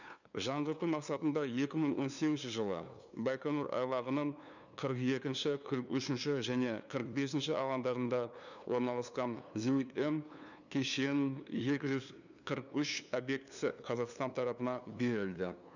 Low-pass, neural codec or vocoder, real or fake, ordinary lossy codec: 7.2 kHz; codec, 16 kHz, 2 kbps, FunCodec, trained on LibriTTS, 25 frames a second; fake; none